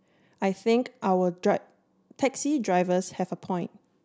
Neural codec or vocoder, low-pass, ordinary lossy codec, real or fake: none; none; none; real